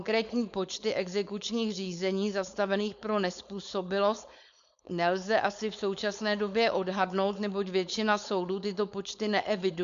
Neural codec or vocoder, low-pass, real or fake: codec, 16 kHz, 4.8 kbps, FACodec; 7.2 kHz; fake